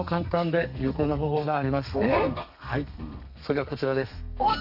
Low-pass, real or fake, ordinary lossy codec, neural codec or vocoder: 5.4 kHz; fake; none; codec, 32 kHz, 1.9 kbps, SNAC